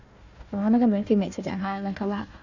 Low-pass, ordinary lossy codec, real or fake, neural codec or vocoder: 7.2 kHz; Opus, 64 kbps; fake; codec, 16 kHz, 1 kbps, FunCodec, trained on Chinese and English, 50 frames a second